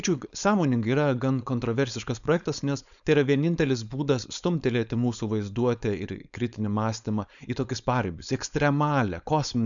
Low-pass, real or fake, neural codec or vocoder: 7.2 kHz; fake; codec, 16 kHz, 4.8 kbps, FACodec